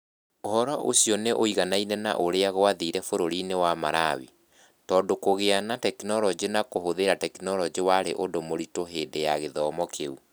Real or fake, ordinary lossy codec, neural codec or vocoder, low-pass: real; none; none; none